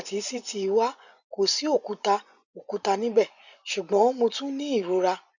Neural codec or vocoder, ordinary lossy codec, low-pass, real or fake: vocoder, 24 kHz, 100 mel bands, Vocos; none; 7.2 kHz; fake